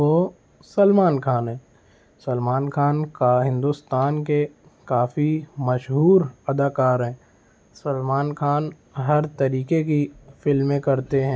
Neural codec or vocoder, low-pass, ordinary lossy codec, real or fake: none; none; none; real